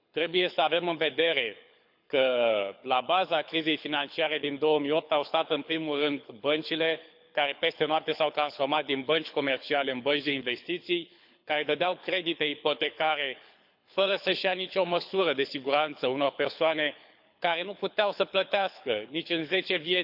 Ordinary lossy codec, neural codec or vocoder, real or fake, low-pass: none; codec, 24 kHz, 6 kbps, HILCodec; fake; 5.4 kHz